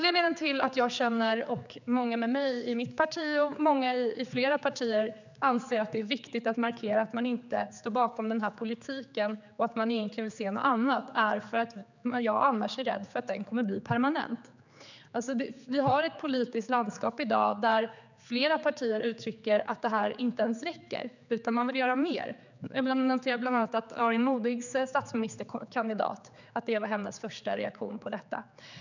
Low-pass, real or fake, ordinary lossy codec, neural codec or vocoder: 7.2 kHz; fake; none; codec, 16 kHz, 4 kbps, X-Codec, HuBERT features, trained on general audio